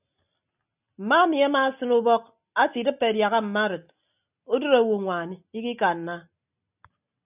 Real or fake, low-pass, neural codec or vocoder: real; 3.6 kHz; none